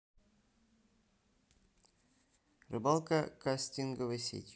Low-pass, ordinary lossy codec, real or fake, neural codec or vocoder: none; none; real; none